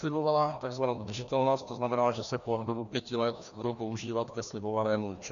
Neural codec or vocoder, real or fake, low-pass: codec, 16 kHz, 1 kbps, FreqCodec, larger model; fake; 7.2 kHz